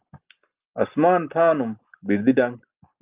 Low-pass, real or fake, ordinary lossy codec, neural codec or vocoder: 3.6 kHz; real; Opus, 24 kbps; none